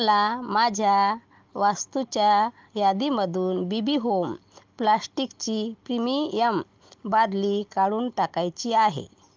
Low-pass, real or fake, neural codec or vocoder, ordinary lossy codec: 7.2 kHz; real; none; Opus, 32 kbps